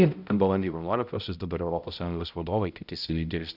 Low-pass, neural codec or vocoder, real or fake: 5.4 kHz; codec, 16 kHz, 0.5 kbps, X-Codec, HuBERT features, trained on balanced general audio; fake